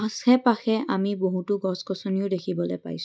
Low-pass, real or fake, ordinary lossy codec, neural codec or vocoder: none; real; none; none